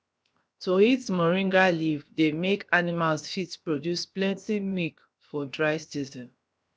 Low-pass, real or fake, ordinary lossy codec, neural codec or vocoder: none; fake; none; codec, 16 kHz, 0.7 kbps, FocalCodec